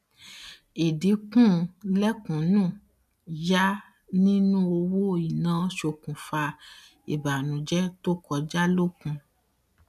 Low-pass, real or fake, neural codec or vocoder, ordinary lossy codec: 14.4 kHz; real; none; none